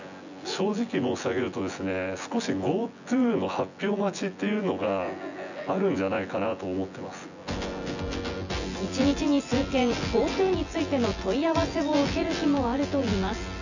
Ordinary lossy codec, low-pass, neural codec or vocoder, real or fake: none; 7.2 kHz; vocoder, 24 kHz, 100 mel bands, Vocos; fake